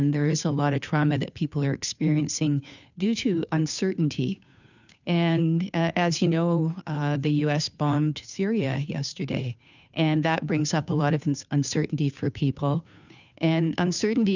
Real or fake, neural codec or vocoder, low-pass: fake; codec, 16 kHz, 2 kbps, FunCodec, trained on Chinese and English, 25 frames a second; 7.2 kHz